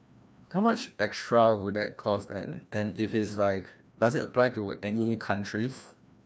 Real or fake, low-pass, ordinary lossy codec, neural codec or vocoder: fake; none; none; codec, 16 kHz, 1 kbps, FreqCodec, larger model